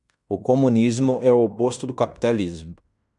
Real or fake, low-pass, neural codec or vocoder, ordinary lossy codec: fake; 10.8 kHz; codec, 16 kHz in and 24 kHz out, 0.9 kbps, LongCat-Audio-Codec, fine tuned four codebook decoder; MP3, 96 kbps